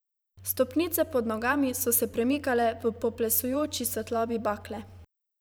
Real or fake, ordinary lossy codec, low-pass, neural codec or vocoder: fake; none; none; vocoder, 44.1 kHz, 128 mel bands every 512 samples, BigVGAN v2